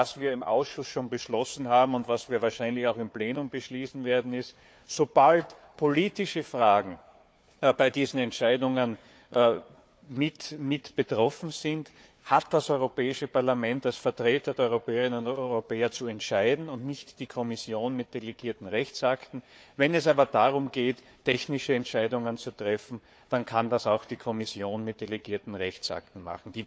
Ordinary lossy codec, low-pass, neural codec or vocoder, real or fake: none; none; codec, 16 kHz, 4 kbps, FunCodec, trained on Chinese and English, 50 frames a second; fake